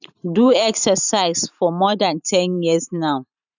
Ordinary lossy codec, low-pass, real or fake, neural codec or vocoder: none; 7.2 kHz; fake; vocoder, 44.1 kHz, 128 mel bands every 512 samples, BigVGAN v2